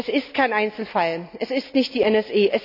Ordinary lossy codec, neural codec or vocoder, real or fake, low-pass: none; none; real; 5.4 kHz